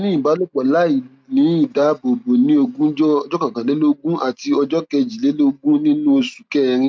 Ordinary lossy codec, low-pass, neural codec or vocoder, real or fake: none; none; none; real